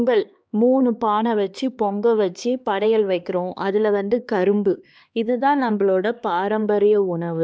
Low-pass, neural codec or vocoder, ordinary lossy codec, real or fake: none; codec, 16 kHz, 2 kbps, X-Codec, HuBERT features, trained on LibriSpeech; none; fake